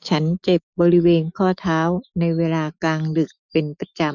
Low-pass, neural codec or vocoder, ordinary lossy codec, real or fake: 7.2 kHz; autoencoder, 48 kHz, 128 numbers a frame, DAC-VAE, trained on Japanese speech; none; fake